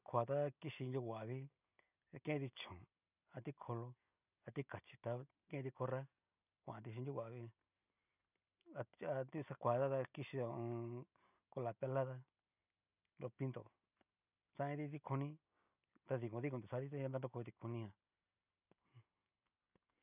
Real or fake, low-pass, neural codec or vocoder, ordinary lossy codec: real; 3.6 kHz; none; none